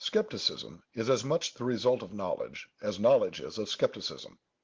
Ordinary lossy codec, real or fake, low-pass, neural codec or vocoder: Opus, 16 kbps; real; 7.2 kHz; none